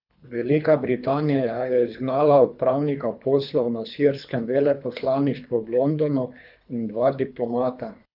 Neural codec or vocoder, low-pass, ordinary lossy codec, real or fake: codec, 24 kHz, 3 kbps, HILCodec; 5.4 kHz; none; fake